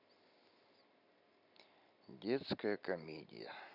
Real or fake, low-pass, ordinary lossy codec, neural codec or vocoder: real; 5.4 kHz; none; none